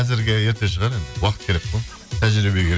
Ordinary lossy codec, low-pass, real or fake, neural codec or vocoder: none; none; real; none